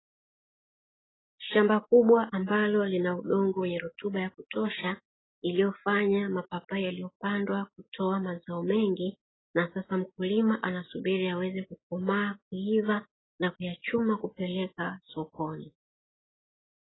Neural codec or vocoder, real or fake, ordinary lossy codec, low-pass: none; real; AAC, 16 kbps; 7.2 kHz